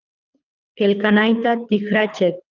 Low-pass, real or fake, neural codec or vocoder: 7.2 kHz; fake; codec, 24 kHz, 3 kbps, HILCodec